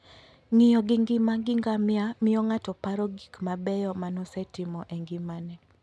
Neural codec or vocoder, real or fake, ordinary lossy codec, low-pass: none; real; none; none